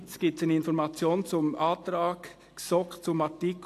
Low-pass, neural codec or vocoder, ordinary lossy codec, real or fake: 14.4 kHz; none; AAC, 64 kbps; real